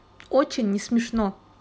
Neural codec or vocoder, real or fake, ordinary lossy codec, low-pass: none; real; none; none